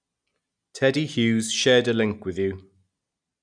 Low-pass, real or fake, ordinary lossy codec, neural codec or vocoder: 9.9 kHz; real; none; none